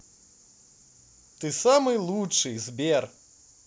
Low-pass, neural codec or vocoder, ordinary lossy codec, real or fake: none; none; none; real